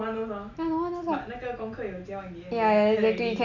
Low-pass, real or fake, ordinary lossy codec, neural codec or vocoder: 7.2 kHz; real; none; none